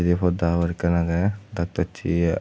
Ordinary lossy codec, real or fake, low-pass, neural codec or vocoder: none; real; none; none